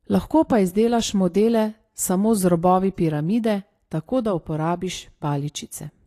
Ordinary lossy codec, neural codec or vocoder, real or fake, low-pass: AAC, 48 kbps; none; real; 14.4 kHz